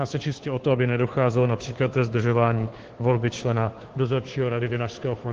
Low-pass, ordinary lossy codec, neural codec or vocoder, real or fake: 7.2 kHz; Opus, 16 kbps; codec, 16 kHz, 2 kbps, FunCodec, trained on Chinese and English, 25 frames a second; fake